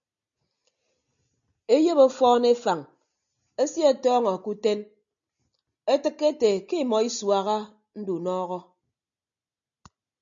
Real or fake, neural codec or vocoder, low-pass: real; none; 7.2 kHz